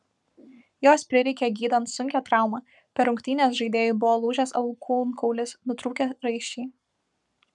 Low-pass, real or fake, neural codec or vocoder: 10.8 kHz; fake; codec, 44.1 kHz, 7.8 kbps, Pupu-Codec